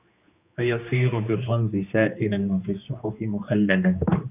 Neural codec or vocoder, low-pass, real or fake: codec, 16 kHz, 2 kbps, X-Codec, HuBERT features, trained on general audio; 3.6 kHz; fake